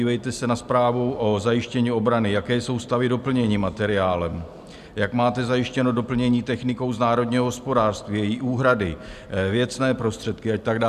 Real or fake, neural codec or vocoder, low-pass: real; none; 14.4 kHz